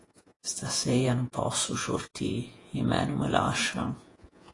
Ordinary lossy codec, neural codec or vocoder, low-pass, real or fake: AAC, 32 kbps; vocoder, 48 kHz, 128 mel bands, Vocos; 10.8 kHz; fake